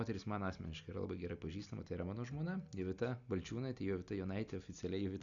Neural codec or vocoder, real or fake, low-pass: none; real; 7.2 kHz